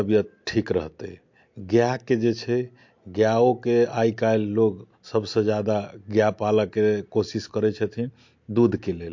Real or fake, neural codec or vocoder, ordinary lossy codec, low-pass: real; none; MP3, 48 kbps; 7.2 kHz